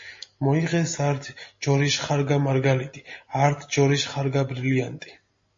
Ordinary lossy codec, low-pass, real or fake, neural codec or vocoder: MP3, 32 kbps; 7.2 kHz; real; none